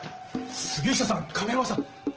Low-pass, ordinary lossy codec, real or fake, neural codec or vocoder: 7.2 kHz; Opus, 16 kbps; real; none